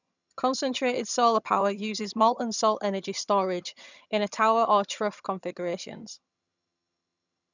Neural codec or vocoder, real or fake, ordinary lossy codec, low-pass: vocoder, 22.05 kHz, 80 mel bands, HiFi-GAN; fake; none; 7.2 kHz